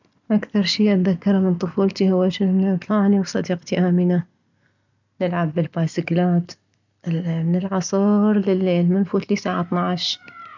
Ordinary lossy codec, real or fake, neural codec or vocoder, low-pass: none; real; none; 7.2 kHz